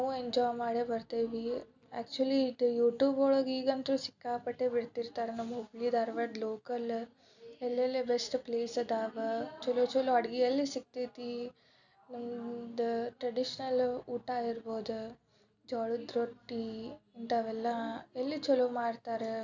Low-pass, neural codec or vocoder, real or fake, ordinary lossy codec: 7.2 kHz; none; real; none